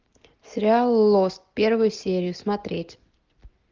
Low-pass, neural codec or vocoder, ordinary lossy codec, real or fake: 7.2 kHz; none; Opus, 32 kbps; real